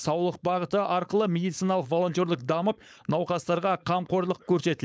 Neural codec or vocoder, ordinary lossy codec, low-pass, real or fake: codec, 16 kHz, 4.8 kbps, FACodec; none; none; fake